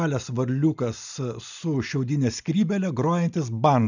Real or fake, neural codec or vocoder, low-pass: real; none; 7.2 kHz